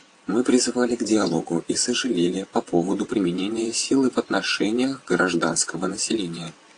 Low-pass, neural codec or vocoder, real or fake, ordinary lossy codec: 9.9 kHz; vocoder, 22.05 kHz, 80 mel bands, WaveNeXt; fake; AAC, 48 kbps